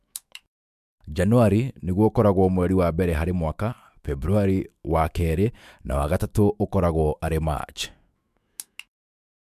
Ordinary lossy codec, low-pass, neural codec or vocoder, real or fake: MP3, 96 kbps; 14.4 kHz; autoencoder, 48 kHz, 128 numbers a frame, DAC-VAE, trained on Japanese speech; fake